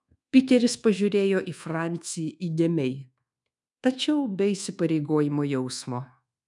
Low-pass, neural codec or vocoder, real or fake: 10.8 kHz; codec, 24 kHz, 1.2 kbps, DualCodec; fake